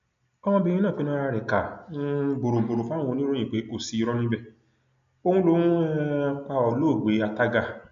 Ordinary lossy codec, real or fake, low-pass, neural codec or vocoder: none; real; 7.2 kHz; none